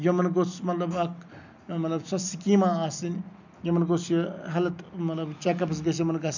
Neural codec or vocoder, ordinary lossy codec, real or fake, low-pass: none; none; real; 7.2 kHz